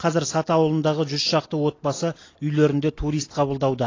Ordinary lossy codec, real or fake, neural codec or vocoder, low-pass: AAC, 32 kbps; real; none; 7.2 kHz